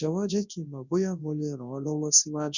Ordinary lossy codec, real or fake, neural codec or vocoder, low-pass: none; fake; codec, 24 kHz, 0.9 kbps, WavTokenizer, large speech release; 7.2 kHz